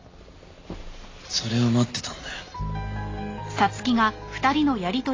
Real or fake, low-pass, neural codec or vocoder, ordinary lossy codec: real; 7.2 kHz; none; AAC, 32 kbps